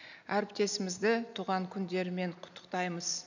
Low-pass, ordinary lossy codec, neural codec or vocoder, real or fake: 7.2 kHz; none; none; real